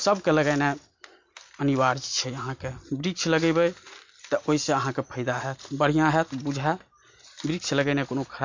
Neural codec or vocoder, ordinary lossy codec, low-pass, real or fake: none; MP3, 48 kbps; 7.2 kHz; real